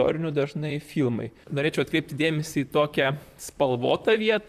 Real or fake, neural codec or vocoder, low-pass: fake; vocoder, 44.1 kHz, 128 mel bands, Pupu-Vocoder; 14.4 kHz